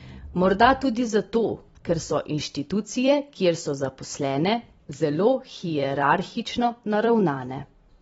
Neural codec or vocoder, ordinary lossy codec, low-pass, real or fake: vocoder, 44.1 kHz, 128 mel bands every 256 samples, BigVGAN v2; AAC, 24 kbps; 19.8 kHz; fake